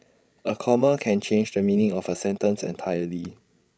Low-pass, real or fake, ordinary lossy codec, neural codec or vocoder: none; fake; none; codec, 16 kHz, 16 kbps, FreqCodec, larger model